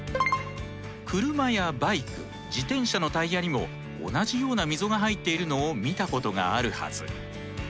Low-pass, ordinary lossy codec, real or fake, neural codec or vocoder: none; none; real; none